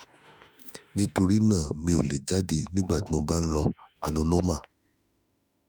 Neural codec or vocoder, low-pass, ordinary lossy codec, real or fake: autoencoder, 48 kHz, 32 numbers a frame, DAC-VAE, trained on Japanese speech; none; none; fake